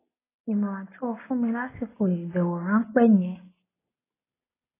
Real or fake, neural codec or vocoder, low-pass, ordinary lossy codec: real; none; 3.6 kHz; AAC, 16 kbps